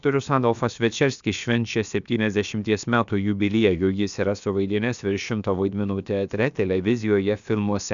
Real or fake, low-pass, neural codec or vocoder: fake; 7.2 kHz; codec, 16 kHz, about 1 kbps, DyCAST, with the encoder's durations